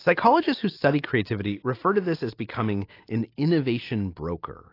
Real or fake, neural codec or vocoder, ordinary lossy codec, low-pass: real; none; AAC, 32 kbps; 5.4 kHz